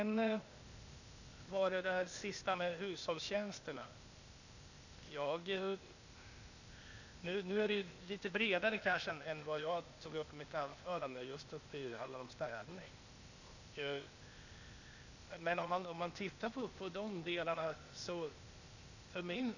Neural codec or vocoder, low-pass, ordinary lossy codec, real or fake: codec, 16 kHz, 0.8 kbps, ZipCodec; 7.2 kHz; none; fake